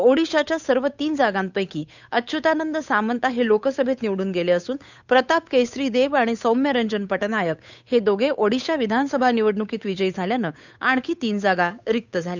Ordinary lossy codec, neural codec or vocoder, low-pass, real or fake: none; codec, 16 kHz, 8 kbps, FunCodec, trained on Chinese and English, 25 frames a second; 7.2 kHz; fake